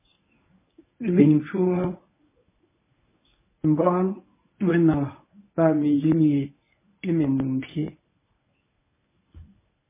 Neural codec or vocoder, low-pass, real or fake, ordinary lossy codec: codec, 24 kHz, 0.9 kbps, WavTokenizer, medium speech release version 1; 3.6 kHz; fake; MP3, 16 kbps